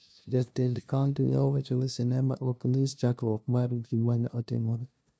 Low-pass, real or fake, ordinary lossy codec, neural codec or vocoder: none; fake; none; codec, 16 kHz, 0.5 kbps, FunCodec, trained on LibriTTS, 25 frames a second